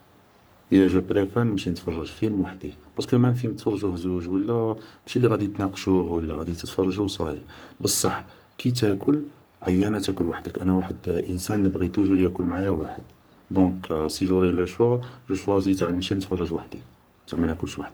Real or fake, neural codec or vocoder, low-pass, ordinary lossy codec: fake; codec, 44.1 kHz, 3.4 kbps, Pupu-Codec; none; none